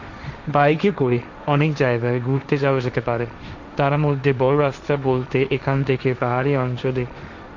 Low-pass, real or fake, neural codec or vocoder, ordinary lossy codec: 7.2 kHz; fake; codec, 16 kHz, 1.1 kbps, Voila-Tokenizer; none